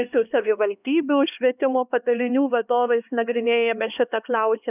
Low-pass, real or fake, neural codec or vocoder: 3.6 kHz; fake; codec, 16 kHz, 2 kbps, X-Codec, HuBERT features, trained on LibriSpeech